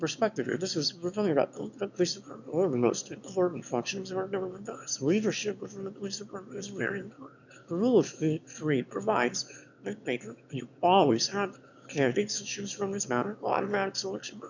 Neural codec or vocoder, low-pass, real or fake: autoencoder, 22.05 kHz, a latent of 192 numbers a frame, VITS, trained on one speaker; 7.2 kHz; fake